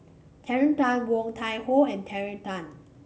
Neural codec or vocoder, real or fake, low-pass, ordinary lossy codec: none; real; none; none